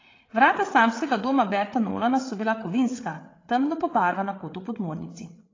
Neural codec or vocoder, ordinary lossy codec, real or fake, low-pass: codec, 16 kHz, 8 kbps, FreqCodec, larger model; AAC, 32 kbps; fake; 7.2 kHz